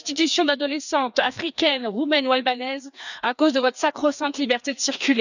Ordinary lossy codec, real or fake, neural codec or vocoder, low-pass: none; fake; codec, 16 kHz, 2 kbps, FreqCodec, larger model; 7.2 kHz